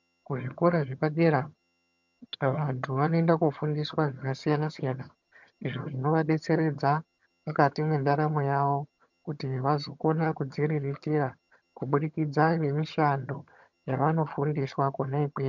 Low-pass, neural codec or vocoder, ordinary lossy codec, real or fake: 7.2 kHz; vocoder, 22.05 kHz, 80 mel bands, HiFi-GAN; MP3, 64 kbps; fake